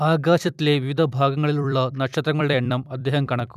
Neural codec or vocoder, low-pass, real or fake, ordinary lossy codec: vocoder, 44.1 kHz, 128 mel bands every 256 samples, BigVGAN v2; 14.4 kHz; fake; none